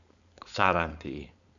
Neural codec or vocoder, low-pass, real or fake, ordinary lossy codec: codec, 16 kHz, 4.8 kbps, FACodec; 7.2 kHz; fake; none